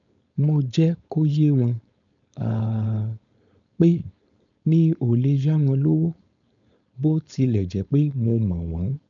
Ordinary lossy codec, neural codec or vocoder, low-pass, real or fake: none; codec, 16 kHz, 4.8 kbps, FACodec; 7.2 kHz; fake